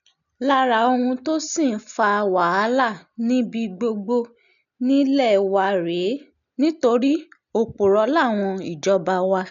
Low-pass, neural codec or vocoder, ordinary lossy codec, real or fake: 7.2 kHz; none; none; real